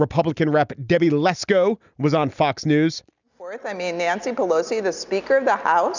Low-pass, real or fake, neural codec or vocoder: 7.2 kHz; real; none